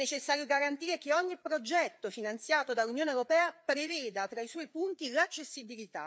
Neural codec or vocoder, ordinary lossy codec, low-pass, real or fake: codec, 16 kHz, 4 kbps, FreqCodec, larger model; none; none; fake